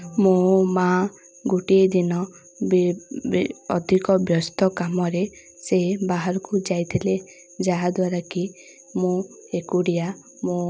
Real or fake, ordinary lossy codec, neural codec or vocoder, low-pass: real; none; none; none